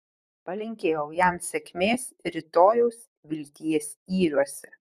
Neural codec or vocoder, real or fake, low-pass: vocoder, 44.1 kHz, 128 mel bands, Pupu-Vocoder; fake; 14.4 kHz